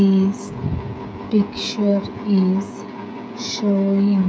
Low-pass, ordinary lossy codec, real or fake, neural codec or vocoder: none; none; fake; codec, 16 kHz, 16 kbps, FreqCodec, smaller model